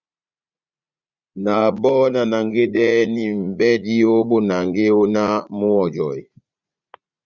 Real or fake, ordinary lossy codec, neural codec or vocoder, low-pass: fake; Opus, 64 kbps; vocoder, 44.1 kHz, 80 mel bands, Vocos; 7.2 kHz